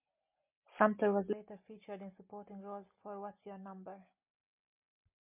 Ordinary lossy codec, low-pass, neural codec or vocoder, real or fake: MP3, 32 kbps; 3.6 kHz; none; real